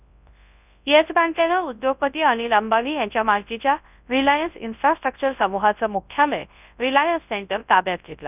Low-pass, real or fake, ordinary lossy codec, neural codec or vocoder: 3.6 kHz; fake; none; codec, 24 kHz, 0.9 kbps, WavTokenizer, large speech release